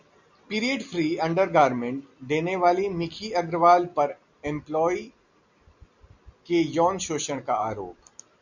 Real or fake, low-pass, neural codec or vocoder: real; 7.2 kHz; none